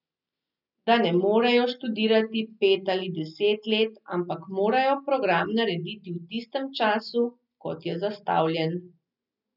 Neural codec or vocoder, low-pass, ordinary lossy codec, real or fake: none; 5.4 kHz; none; real